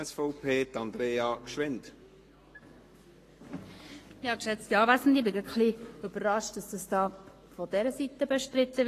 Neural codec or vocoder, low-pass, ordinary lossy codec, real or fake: codec, 44.1 kHz, 7.8 kbps, Pupu-Codec; 14.4 kHz; AAC, 48 kbps; fake